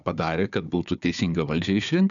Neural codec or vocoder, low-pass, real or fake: codec, 16 kHz, 4 kbps, FunCodec, trained on Chinese and English, 50 frames a second; 7.2 kHz; fake